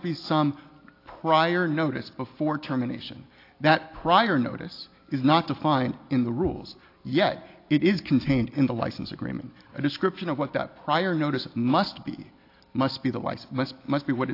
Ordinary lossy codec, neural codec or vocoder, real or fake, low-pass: AAC, 32 kbps; none; real; 5.4 kHz